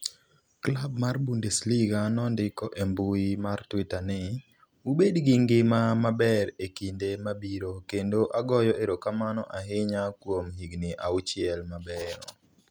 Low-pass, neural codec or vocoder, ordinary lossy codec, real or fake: none; none; none; real